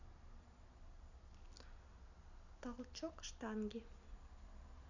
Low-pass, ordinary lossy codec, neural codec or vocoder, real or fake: 7.2 kHz; none; none; real